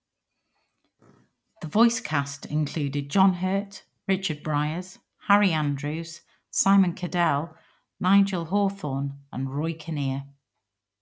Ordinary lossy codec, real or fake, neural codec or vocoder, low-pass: none; real; none; none